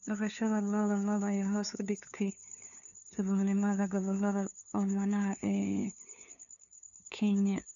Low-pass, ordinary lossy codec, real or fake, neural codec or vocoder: 7.2 kHz; none; fake; codec, 16 kHz, 2 kbps, FunCodec, trained on LibriTTS, 25 frames a second